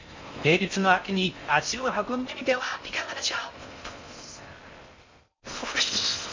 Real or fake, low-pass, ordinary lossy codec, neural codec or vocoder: fake; 7.2 kHz; MP3, 48 kbps; codec, 16 kHz in and 24 kHz out, 0.6 kbps, FocalCodec, streaming, 4096 codes